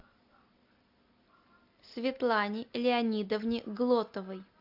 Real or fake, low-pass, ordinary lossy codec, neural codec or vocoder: real; 5.4 kHz; AAC, 48 kbps; none